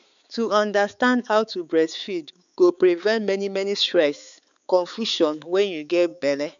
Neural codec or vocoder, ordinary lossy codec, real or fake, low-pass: codec, 16 kHz, 4 kbps, X-Codec, HuBERT features, trained on balanced general audio; none; fake; 7.2 kHz